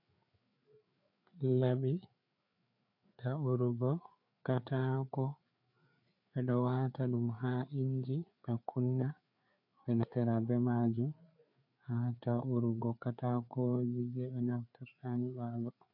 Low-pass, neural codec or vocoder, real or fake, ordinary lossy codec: 5.4 kHz; codec, 16 kHz, 4 kbps, FreqCodec, larger model; fake; MP3, 48 kbps